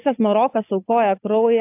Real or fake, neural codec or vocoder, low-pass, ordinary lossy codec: real; none; 3.6 kHz; AAC, 24 kbps